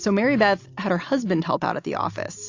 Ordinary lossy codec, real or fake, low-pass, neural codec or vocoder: AAC, 48 kbps; real; 7.2 kHz; none